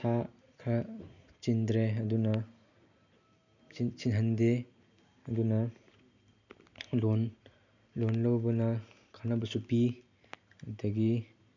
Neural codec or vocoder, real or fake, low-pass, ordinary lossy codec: none; real; 7.2 kHz; none